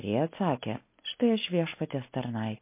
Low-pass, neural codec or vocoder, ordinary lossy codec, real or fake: 3.6 kHz; none; MP3, 24 kbps; real